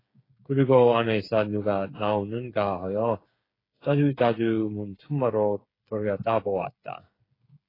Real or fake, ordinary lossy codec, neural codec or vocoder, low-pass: fake; AAC, 24 kbps; codec, 16 kHz, 8 kbps, FreqCodec, smaller model; 5.4 kHz